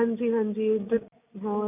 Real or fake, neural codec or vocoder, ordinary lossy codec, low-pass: real; none; MP3, 32 kbps; 3.6 kHz